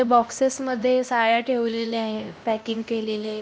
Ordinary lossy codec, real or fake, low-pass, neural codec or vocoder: none; fake; none; codec, 16 kHz, 1 kbps, X-Codec, HuBERT features, trained on LibriSpeech